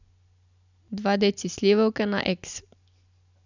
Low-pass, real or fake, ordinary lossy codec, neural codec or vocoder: 7.2 kHz; real; none; none